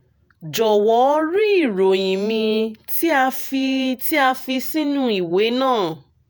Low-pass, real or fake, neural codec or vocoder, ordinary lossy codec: none; fake; vocoder, 48 kHz, 128 mel bands, Vocos; none